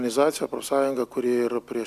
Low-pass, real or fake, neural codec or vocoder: 14.4 kHz; real; none